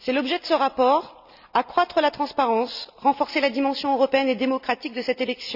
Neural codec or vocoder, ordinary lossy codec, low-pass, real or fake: none; none; 5.4 kHz; real